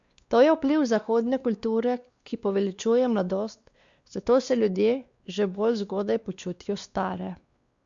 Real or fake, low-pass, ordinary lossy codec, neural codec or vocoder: fake; 7.2 kHz; Opus, 64 kbps; codec, 16 kHz, 2 kbps, X-Codec, WavLM features, trained on Multilingual LibriSpeech